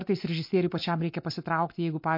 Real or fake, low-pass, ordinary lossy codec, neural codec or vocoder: real; 5.4 kHz; MP3, 32 kbps; none